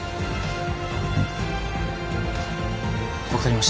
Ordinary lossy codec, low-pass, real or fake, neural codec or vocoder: none; none; real; none